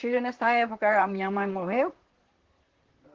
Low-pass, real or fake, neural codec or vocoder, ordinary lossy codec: 7.2 kHz; fake; vocoder, 44.1 kHz, 128 mel bands, Pupu-Vocoder; Opus, 16 kbps